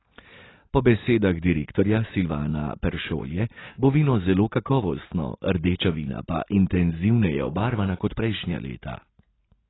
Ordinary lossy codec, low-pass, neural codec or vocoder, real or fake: AAC, 16 kbps; 7.2 kHz; none; real